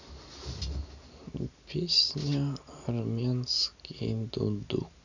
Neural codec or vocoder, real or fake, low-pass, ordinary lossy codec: none; real; 7.2 kHz; none